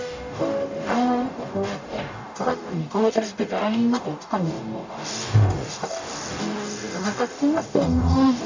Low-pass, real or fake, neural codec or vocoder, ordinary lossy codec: 7.2 kHz; fake; codec, 44.1 kHz, 0.9 kbps, DAC; AAC, 48 kbps